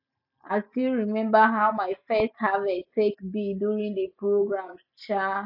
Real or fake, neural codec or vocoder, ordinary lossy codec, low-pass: real; none; none; 5.4 kHz